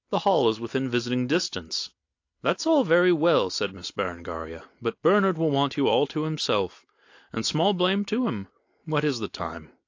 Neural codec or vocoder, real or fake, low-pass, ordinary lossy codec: none; real; 7.2 kHz; AAC, 48 kbps